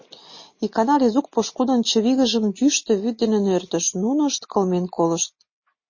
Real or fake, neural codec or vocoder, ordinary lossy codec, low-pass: real; none; MP3, 32 kbps; 7.2 kHz